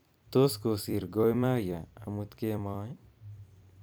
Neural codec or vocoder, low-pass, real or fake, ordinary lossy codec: vocoder, 44.1 kHz, 128 mel bands every 256 samples, BigVGAN v2; none; fake; none